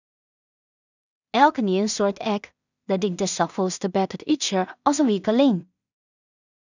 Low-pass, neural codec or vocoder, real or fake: 7.2 kHz; codec, 16 kHz in and 24 kHz out, 0.4 kbps, LongCat-Audio-Codec, two codebook decoder; fake